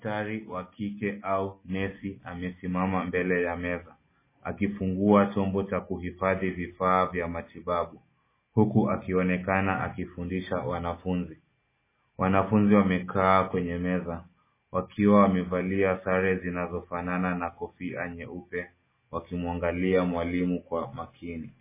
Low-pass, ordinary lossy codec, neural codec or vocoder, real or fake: 3.6 kHz; MP3, 16 kbps; none; real